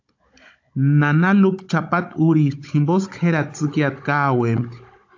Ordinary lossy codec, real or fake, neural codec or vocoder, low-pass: AAC, 48 kbps; fake; codec, 16 kHz, 16 kbps, FunCodec, trained on Chinese and English, 50 frames a second; 7.2 kHz